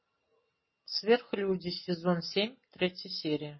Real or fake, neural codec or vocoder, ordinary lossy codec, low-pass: real; none; MP3, 24 kbps; 7.2 kHz